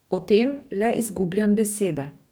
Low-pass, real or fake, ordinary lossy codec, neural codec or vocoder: none; fake; none; codec, 44.1 kHz, 2.6 kbps, DAC